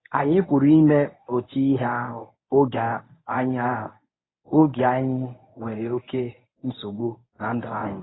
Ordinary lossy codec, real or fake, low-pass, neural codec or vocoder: AAC, 16 kbps; fake; 7.2 kHz; codec, 24 kHz, 0.9 kbps, WavTokenizer, medium speech release version 1